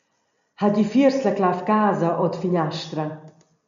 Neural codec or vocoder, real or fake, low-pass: none; real; 7.2 kHz